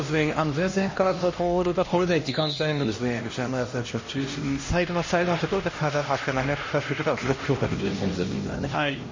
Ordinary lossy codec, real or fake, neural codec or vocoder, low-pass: MP3, 32 kbps; fake; codec, 16 kHz, 1 kbps, X-Codec, HuBERT features, trained on LibriSpeech; 7.2 kHz